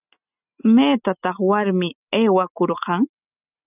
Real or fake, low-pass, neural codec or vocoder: real; 3.6 kHz; none